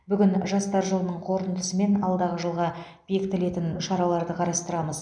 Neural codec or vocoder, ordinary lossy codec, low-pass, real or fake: none; none; 9.9 kHz; real